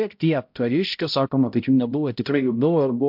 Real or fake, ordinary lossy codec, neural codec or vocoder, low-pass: fake; MP3, 48 kbps; codec, 16 kHz, 0.5 kbps, X-Codec, HuBERT features, trained on balanced general audio; 5.4 kHz